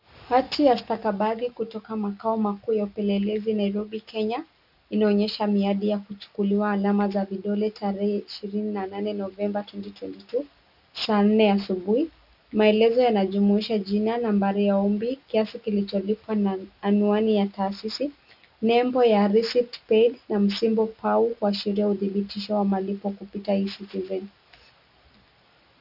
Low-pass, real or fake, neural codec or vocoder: 5.4 kHz; real; none